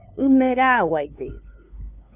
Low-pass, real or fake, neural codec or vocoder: 3.6 kHz; fake; codec, 16 kHz, 2 kbps, FunCodec, trained on LibriTTS, 25 frames a second